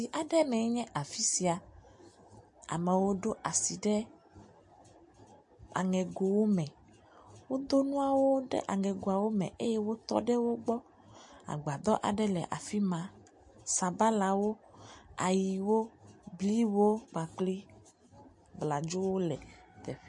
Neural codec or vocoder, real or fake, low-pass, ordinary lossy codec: none; real; 10.8 kHz; MP3, 48 kbps